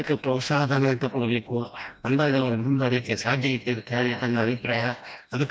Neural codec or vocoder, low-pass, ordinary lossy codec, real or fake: codec, 16 kHz, 1 kbps, FreqCodec, smaller model; none; none; fake